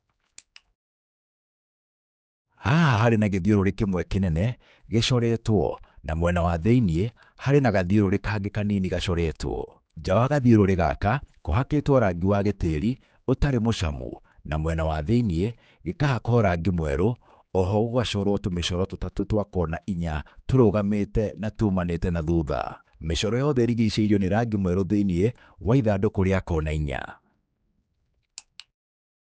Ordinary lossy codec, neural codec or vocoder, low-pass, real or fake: none; codec, 16 kHz, 4 kbps, X-Codec, HuBERT features, trained on general audio; none; fake